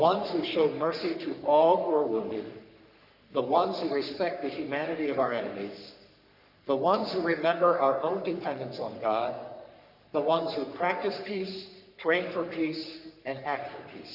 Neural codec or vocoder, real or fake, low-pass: codec, 44.1 kHz, 3.4 kbps, Pupu-Codec; fake; 5.4 kHz